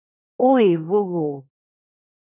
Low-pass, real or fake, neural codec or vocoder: 3.6 kHz; fake; codec, 24 kHz, 1 kbps, SNAC